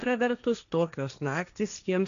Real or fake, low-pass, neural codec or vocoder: fake; 7.2 kHz; codec, 16 kHz, 1.1 kbps, Voila-Tokenizer